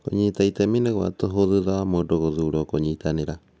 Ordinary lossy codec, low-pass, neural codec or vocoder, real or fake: none; none; none; real